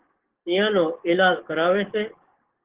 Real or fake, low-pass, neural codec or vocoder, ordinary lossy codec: fake; 3.6 kHz; codec, 44.1 kHz, 7.8 kbps, DAC; Opus, 16 kbps